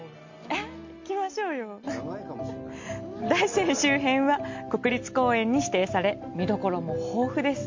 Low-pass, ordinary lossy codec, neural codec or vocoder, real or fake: 7.2 kHz; MP3, 48 kbps; none; real